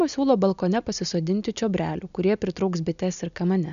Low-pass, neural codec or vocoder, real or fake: 7.2 kHz; none; real